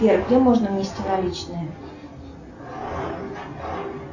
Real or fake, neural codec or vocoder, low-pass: real; none; 7.2 kHz